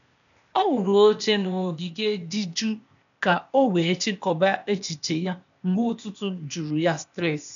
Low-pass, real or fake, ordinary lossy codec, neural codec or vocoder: 7.2 kHz; fake; none; codec, 16 kHz, 0.8 kbps, ZipCodec